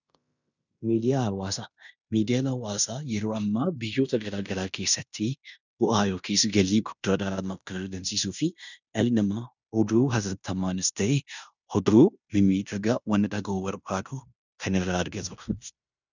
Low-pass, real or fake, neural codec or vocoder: 7.2 kHz; fake; codec, 16 kHz in and 24 kHz out, 0.9 kbps, LongCat-Audio-Codec, fine tuned four codebook decoder